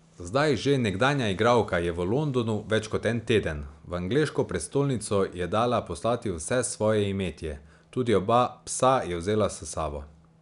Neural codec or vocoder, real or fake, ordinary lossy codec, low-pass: none; real; none; 10.8 kHz